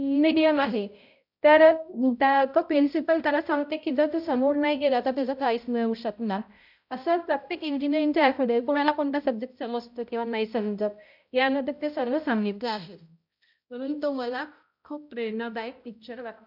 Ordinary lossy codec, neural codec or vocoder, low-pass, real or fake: none; codec, 16 kHz, 0.5 kbps, X-Codec, HuBERT features, trained on balanced general audio; 5.4 kHz; fake